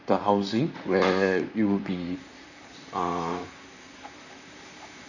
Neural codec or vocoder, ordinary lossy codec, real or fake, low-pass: vocoder, 44.1 kHz, 128 mel bands, Pupu-Vocoder; none; fake; 7.2 kHz